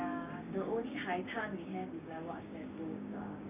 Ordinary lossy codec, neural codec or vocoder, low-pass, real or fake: none; codec, 16 kHz, 6 kbps, DAC; 3.6 kHz; fake